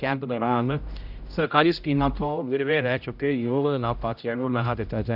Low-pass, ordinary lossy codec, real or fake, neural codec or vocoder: 5.4 kHz; none; fake; codec, 16 kHz, 0.5 kbps, X-Codec, HuBERT features, trained on general audio